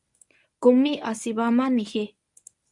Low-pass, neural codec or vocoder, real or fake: 10.8 kHz; codec, 24 kHz, 0.9 kbps, WavTokenizer, medium speech release version 1; fake